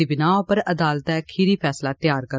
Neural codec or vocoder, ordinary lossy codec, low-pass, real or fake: none; none; none; real